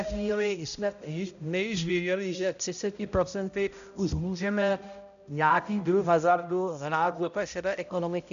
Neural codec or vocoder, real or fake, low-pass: codec, 16 kHz, 0.5 kbps, X-Codec, HuBERT features, trained on balanced general audio; fake; 7.2 kHz